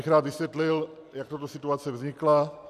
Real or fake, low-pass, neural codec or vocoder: real; 14.4 kHz; none